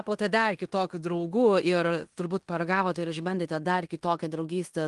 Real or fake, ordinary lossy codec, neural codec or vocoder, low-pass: fake; Opus, 24 kbps; codec, 16 kHz in and 24 kHz out, 0.9 kbps, LongCat-Audio-Codec, fine tuned four codebook decoder; 10.8 kHz